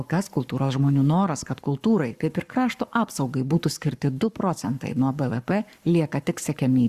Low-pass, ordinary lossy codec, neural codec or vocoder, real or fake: 14.4 kHz; Opus, 64 kbps; codec, 44.1 kHz, 7.8 kbps, Pupu-Codec; fake